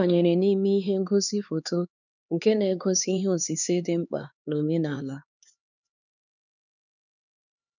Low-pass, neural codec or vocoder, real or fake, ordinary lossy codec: 7.2 kHz; codec, 16 kHz, 4 kbps, X-Codec, HuBERT features, trained on LibriSpeech; fake; none